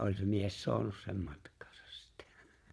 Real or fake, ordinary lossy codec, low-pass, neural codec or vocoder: fake; none; none; vocoder, 22.05 kHz, 80 mel bands, Vocos